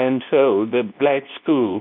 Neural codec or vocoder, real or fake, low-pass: codec, 24 kHz, 0.9 kbps, WavTokenizer, medium speech release version 2; fake; 5.4 kHz